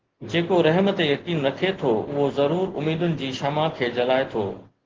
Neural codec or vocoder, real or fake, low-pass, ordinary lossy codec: none; real; 7.2 kHz; Opus, 16 kbps